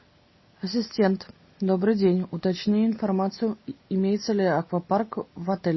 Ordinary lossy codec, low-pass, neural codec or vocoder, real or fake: MP3, 24 kbps; 7.2 kHz; none; real